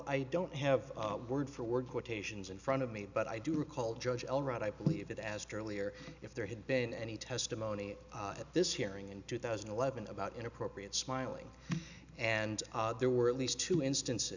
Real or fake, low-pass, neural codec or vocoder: real; 7.2 kHz; none